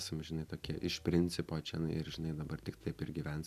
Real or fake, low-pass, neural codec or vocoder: real; 14.4 kHz; none